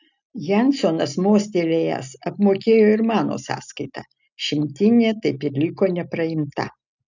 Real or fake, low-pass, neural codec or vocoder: real; 7.2 kHz; none